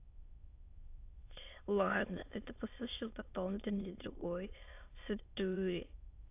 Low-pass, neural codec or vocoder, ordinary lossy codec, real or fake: 3.6 kHz; autoencoder, 22.05 kHz, a latent of 192 numbers a frame, VITS, trained on many speakers; AAC, 32 kbps; fake